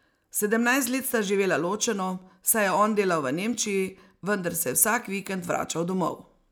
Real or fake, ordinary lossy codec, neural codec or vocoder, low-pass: real; none; none; none